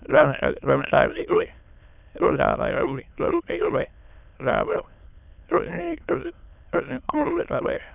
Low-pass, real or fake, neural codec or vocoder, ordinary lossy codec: 3.6 kHz; fake; autoencoder, 22.05 kHz, a latent of 192 numbers a frame, VITS, trained on many speakers; none